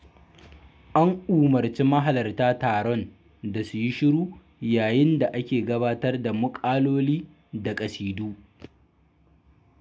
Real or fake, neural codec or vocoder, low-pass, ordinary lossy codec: real; none; none; none